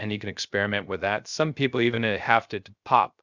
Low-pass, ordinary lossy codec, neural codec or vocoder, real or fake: 7.2 kHz; Opus, 64 kbps; codec, 16 kHz, 0.3 kbps, FocalCodec; fake